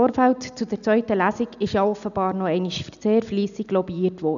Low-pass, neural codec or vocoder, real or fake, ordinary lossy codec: 7.2 kHz; none; real; none